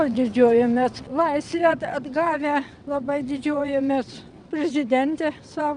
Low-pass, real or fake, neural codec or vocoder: 9.9 kHz; fake; vocoder, 22.05 kHz, 80 mel bands, WaveNeXt